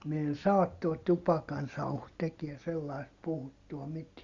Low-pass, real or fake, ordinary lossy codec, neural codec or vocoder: 7.2 kHz; real; Opus, 64 kbps; none